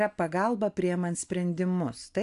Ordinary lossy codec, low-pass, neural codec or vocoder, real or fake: AAC, 64 kbps; 10.8 kHz; none; real